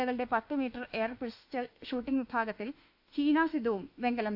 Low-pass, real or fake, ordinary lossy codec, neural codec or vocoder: 5.4 kHz; fake; AAC, 48 kbps; autoencoder, 48 kHz, 32 numbers a frame, DAC-VAE, trained on Japanese speech